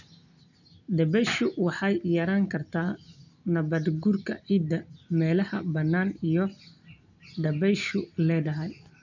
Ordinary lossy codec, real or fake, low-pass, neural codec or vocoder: none; real; 7.2 kHz; none